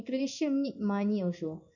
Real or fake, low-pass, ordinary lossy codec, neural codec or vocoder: fake; 7.2 kHz; none; codec, 16 kHz in and 24 kHz out, 1 kbps, XY-Tokenizer